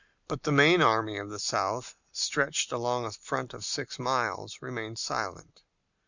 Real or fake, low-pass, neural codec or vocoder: real; 7.2 kHz; none